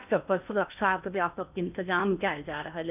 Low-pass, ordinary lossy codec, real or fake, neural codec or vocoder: 3.6 kHz; none; fake; codec, 16 kHz in and 24 kHz out, 0.8 kbps, FocalCodec, streaming, 65536 codes